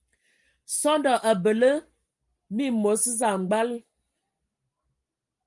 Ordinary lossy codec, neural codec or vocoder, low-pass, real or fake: Opus, 32 kbps; none; 10.8 kHz; real